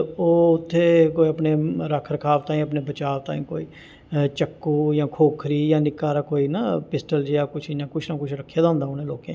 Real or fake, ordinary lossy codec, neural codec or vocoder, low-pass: real; none; none; none